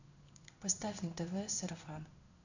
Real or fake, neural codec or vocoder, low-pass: fake; codec, 16 kHz in and 24 kHz out, 1 kbps, XY-Tokenizer; 7.2 kHz